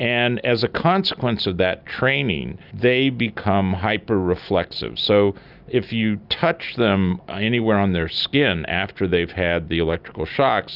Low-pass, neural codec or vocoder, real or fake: 5.4 kHz; none; real